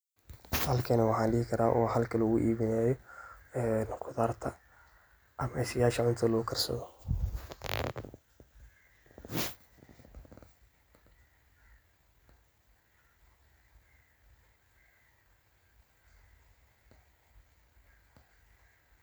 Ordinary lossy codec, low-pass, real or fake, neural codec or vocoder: none; none; real; none